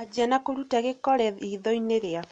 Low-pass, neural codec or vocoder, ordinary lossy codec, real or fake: 9.9 kHz; none; MP3, 64 kbps; real